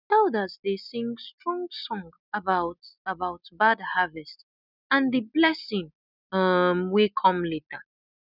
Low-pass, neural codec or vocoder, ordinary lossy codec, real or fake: 5.4 kHz; none; none; real